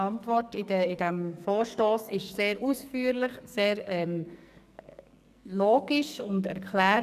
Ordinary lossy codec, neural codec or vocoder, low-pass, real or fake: none; codec, 44.1 kHz, 2.6 kbps, SNAC; 14.4 kHz; fake